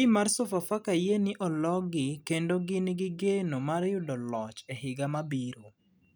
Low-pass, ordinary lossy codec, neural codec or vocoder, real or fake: none; none; none; real